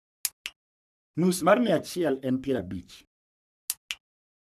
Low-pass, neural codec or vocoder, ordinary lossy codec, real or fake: 14.4 kHz; codec, 44.1 kHz, 3.4 kbps, Pupu-Codec; none; fake